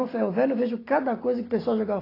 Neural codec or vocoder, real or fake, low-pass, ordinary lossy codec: none; real; 5.4 kHz; AAC, 24 kbps